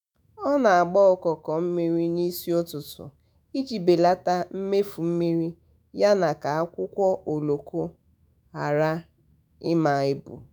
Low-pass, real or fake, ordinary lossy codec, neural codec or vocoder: none; fake; none; autoencoder, 48 kHz, 128 numbers a frame, DAC-VAE, trained on Japanese speech